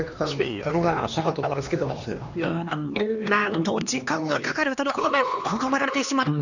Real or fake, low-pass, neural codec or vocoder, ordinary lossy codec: fake; 7.2 kHz; codec, 16 kHz, 2 kbps, X-Codec, HuBERT features, trained on LibriSpeech; none